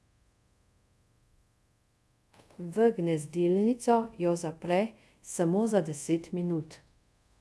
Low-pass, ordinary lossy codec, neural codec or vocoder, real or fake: none; none; codec, 24 kHz, 0.5 kbps, DualCodec; fake